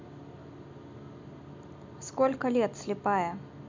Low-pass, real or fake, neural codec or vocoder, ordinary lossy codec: 7.2 kHz; real; none; MP3, 64 kbps